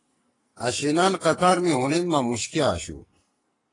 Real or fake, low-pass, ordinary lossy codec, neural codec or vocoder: fake; 10.8 kHz; AAC, 32 kbps; codec, 44.1 kHz, 2.6 kbps, SNAC